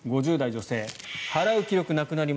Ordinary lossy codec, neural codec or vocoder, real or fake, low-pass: none; none; real; none